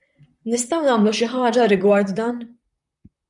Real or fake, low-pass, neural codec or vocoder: fake; 10.8 kHz; vocoder, 44.1 kHz, 128 mel bands, Pupu-Vocoder